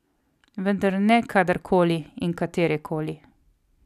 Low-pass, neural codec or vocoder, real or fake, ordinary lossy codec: 14.4 kHz; none; real; none